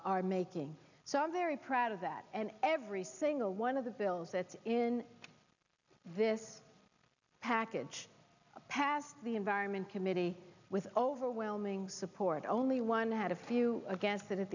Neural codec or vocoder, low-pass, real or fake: none; 7.2 kHz; real